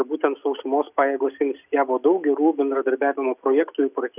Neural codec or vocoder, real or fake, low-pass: none; real; 3.6 kHz